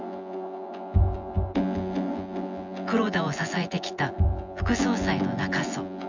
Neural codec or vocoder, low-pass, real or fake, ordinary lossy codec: vocoder, 24 kHz, 100 mel bands, Vocos; 7.2 kHz; fake; none